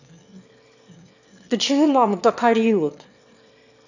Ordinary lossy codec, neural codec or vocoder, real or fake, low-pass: none; autoencoder, 22.05 kHz, a latent of 192 numbers a frame, VITS, trained on one speaker; fake; 7.2 kHz